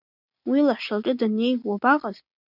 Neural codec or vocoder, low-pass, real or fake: none; 5.4 kHz; real